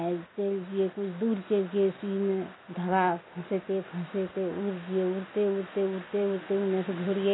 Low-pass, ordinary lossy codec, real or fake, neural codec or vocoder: 7.2 kHz; AAC, 16 kbps; real; none